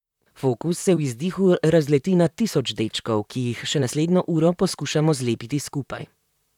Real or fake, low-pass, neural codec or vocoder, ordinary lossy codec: fake; 19.8 kHz; vocoder, 44.1 kHz, 128 mel bands, Pupu-Vocoder; none